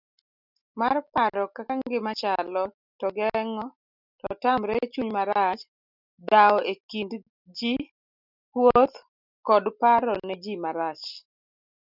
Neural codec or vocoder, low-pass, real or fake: none; 5.4 kHz; real